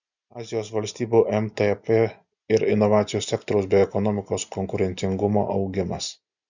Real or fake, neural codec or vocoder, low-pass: real; none; 7.2 kHz